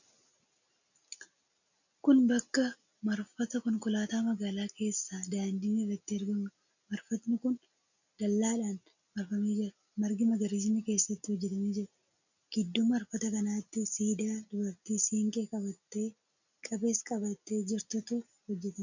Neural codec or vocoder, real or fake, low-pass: none; real; 7.2 kHz